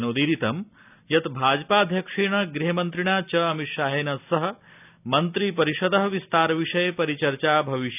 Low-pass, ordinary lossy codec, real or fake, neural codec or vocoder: 3.6 kHz; none; real; none